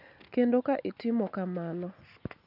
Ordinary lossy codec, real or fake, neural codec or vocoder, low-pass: none; real; none; 5.4 kHz